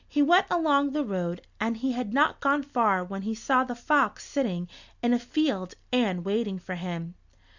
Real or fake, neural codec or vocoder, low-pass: real; none; 7.2 kHz